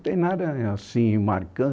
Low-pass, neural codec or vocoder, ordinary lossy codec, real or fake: none; none; none; real